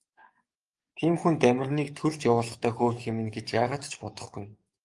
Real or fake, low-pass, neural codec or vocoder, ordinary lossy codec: fake; 10.8 kHz; codec, 44.1 kHz, 7.8 kbps, DAC; Opus, 32 kbps